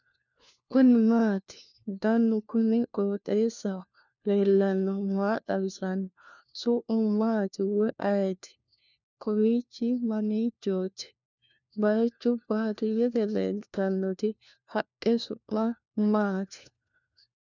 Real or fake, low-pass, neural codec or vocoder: fake; 7.2 kHz; codec, 16 kHz, 1 kbps, FunCodec, trained on LibriTTS, 50 frames a second